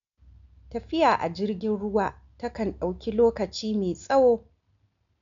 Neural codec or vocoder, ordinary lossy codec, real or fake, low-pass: none; none; real; 7.2 kHz